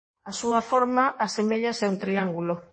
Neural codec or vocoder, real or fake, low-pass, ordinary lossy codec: codec, 16 kHz in and 24 kHz out, 1.1 kbps, FireRedTTS-2 codec; fake; 9.9 kHz; MP3, 32 kbps